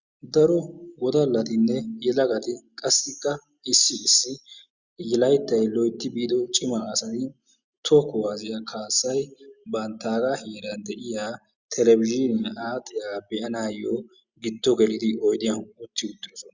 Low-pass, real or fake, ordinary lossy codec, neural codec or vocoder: 7.2 kHz; real; Opus, 64 kbps; none